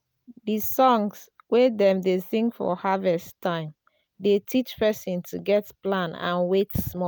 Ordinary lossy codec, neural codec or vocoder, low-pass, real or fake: none; none; none; real